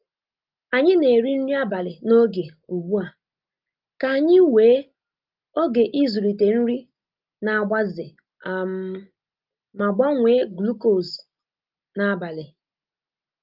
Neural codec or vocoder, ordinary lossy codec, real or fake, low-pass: none; Opus, 24 kbps; real; 5.4 kHz